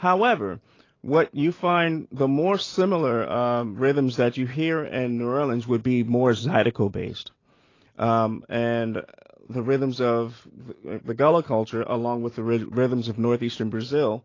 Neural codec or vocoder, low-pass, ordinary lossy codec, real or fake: none; 7.2 kHz; AAC, 32 kbps; real